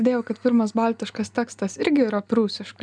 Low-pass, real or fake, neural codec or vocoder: 9.9 kHz; real; none